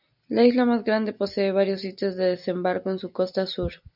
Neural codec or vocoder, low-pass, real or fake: none; 5.4 kHz; real